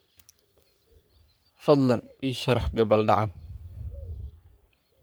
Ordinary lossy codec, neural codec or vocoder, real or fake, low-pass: none; codec, 44.1 kHz, 3.4 kbps, Pupu-Codec; fake; none